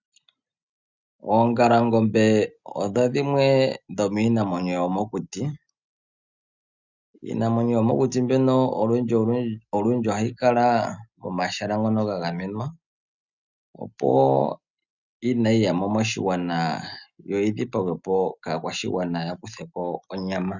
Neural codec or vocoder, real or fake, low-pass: none; real; 7.2 kHz